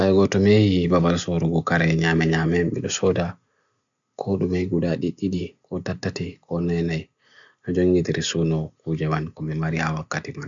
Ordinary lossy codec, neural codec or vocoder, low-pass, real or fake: none; none; 7.2 kHz; real